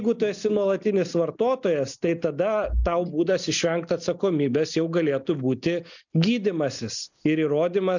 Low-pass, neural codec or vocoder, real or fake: 7.2 kHz; none; real